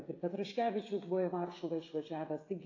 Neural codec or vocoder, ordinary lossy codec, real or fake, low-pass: codec, 16 kHz, 16 kbps, FreqCodec, smaller model; AAC, 32 kbps; fake; 7.2 kHz